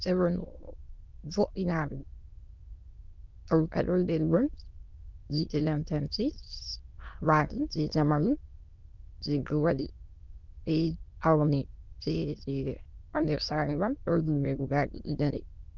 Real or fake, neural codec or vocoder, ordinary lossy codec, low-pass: fake; autoencoder, 22.05 kHz, a latent of 192 numbers a frame, VITS, trained on many speakers; Opus, 24 kbps; 7.2 kHz